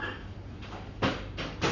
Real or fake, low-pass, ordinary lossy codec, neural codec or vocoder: fake; 7.2 kHz; none; codec, 44.1 kHz, 7.8 kbps, Pupu-Codec